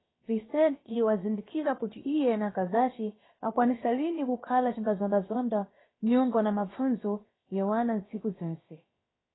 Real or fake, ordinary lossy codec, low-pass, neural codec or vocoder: fake; AAC, 16 kbps; 7.2 kHz; codec, 16 kHz, about 1 kbps, DyCAST, with the encoder's durations